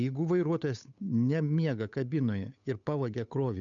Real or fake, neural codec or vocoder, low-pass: fake; codec, 16 kHz, 8 kbps, FunCodec, trained on Chinese and English, 25 frames a second; 7.2 kHz